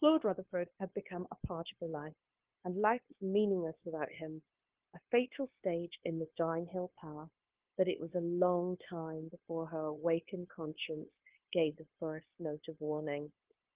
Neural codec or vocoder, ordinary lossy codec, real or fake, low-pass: codec, 16 kHz, 0.9 kbps, LongCat-Audio-Codec; Opus, 16 kbps; fake; 3.6 kHz